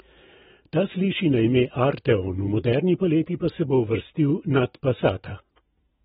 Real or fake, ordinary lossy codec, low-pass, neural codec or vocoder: real; AAC, 16 kbps; 19.8 kHz; none